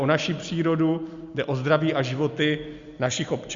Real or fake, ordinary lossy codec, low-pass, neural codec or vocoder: real; Opus, 64 kbps; 7.2 kHz; none